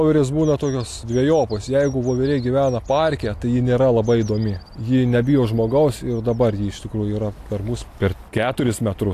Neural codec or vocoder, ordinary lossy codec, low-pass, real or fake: none; AAC, 64 kbps; 14.4 kHz; real